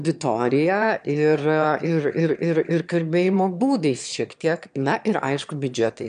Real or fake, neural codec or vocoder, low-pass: fake; autoencoder, 22.05 kHz, a latent of 192 numbers a frame, VITS, trained on one speaker; 9.9 kHz